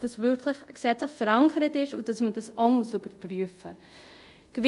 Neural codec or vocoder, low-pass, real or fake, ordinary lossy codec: codec, 24 kHz, 0.5 kbps, DualCodec; 10.8 kHz; fake; MP3, 48 kbps